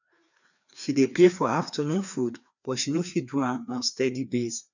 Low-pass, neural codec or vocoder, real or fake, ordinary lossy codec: 7.2 kHz; codec, 16 kHz, 2 kbps, FreqCodec, larger model; fake; none